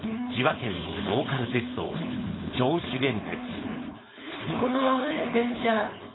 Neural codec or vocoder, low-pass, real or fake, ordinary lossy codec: codec, 16 kHz, 4.8 kbps, FACodec; 7.2 kHz; fake; AAC, 16 kbps